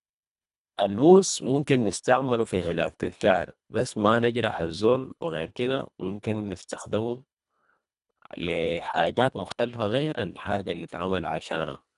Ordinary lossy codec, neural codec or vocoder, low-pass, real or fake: none; codec, 24 kHz, 1.5 kbps, HILCodec; 10.8 kHz; fake